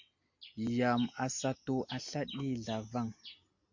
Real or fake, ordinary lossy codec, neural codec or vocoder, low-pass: real; MP3, 48 kbps; none; 7.2 kHz